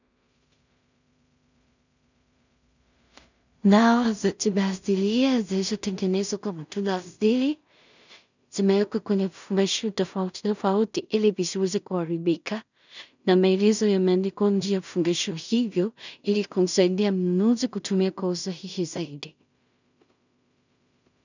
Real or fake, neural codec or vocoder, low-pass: fake; codec, 16 kHz in and 24 kHz out, 0.4 kbps, LongCat-Audio-Codec, two codebook decoder; 7.2 kHz